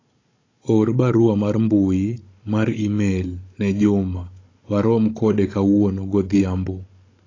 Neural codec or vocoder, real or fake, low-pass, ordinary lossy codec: codec, 16 kHz, 16 kbps, FunCodec, trained on Chinese and English, 50 frames a second; fake; 7.2 kHz; AAC, 32 kbps